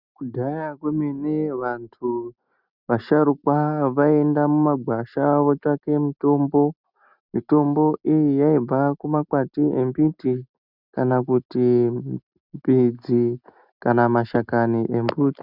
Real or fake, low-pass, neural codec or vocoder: real; 5.4 kHz; none